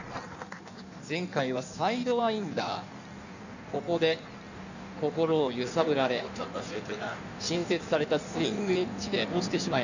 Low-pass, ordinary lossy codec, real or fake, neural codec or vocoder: 7.2 kHz; none; fake; codec, 16 kHz in and 24 kHz out, 1.1 kbps, FireRedTTS-2 codec